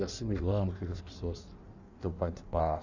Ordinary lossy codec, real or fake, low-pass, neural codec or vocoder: none; fake; 7.2 kHz; codec, 16 kHz in and 24 kHz out, 1.1 kbps, FireRedTTS-2 codec